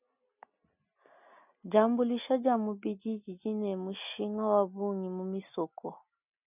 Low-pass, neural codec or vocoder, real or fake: 3.6 kHz; none; real